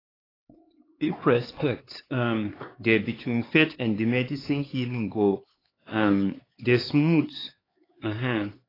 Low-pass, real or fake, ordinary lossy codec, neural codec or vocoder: 5.4 kHz; fake; AAC, 24 kbps; codec, 16 kHz, 4 kbps, X-Codec, HuBERT features, trained on LibriSpeech